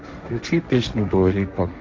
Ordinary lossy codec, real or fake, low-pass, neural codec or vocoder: none; fake; none; codec, 16 kHz, 1.1 kbps, Voila-Tokenizer